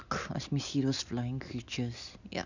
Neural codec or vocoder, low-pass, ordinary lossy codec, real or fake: none; 7.2 kHz; none; real